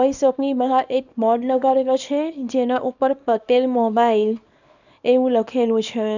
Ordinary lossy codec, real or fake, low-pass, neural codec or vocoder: none; fake; 7.2 kHz; codec, 24 kHz, 0.9 kbps, WavTokenizer, small release